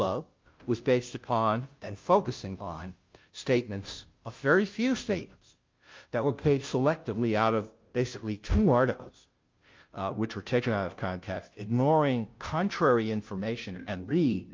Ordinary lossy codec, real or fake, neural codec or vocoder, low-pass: Opus, 24 kbps; fake; codec, 16 kHz, 0.5 kbps, FunCodec, trained on Chinese and English, 25 frames a second; 7.2 kHz